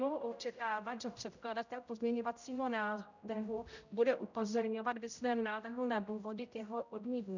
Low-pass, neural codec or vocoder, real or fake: 7.2 kHz; codec, 16 kHz, 0.5 kbps, X-Codec, HuBERT features, trained on general audio; fake